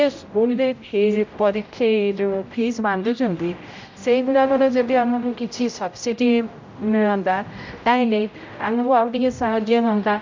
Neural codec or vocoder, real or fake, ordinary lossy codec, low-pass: codec, 16 kHz, 0.5 kbps, X-Codec, HuBERT features, trained on general audio; fake; MP3, 64 kbps; 7.2 kHz